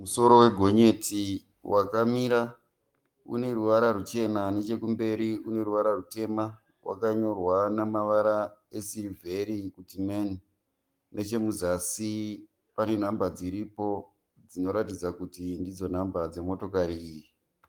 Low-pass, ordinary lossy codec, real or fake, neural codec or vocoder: 19.8 kHz; Opus, 32 kbps; fake; codec, 44.1 kHz, 7.8 kbps, Pupu-Codec